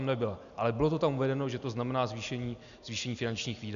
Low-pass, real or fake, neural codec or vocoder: 7.2 kHz; real; none